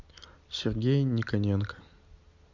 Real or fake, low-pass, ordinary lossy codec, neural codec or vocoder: real; 7.2 kHz; Opus, 64 kbps; none